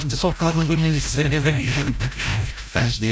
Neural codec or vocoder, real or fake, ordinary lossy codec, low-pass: codec, 16 kHz, 0.5 kbps, FreqCodec, larger model; fake; none; none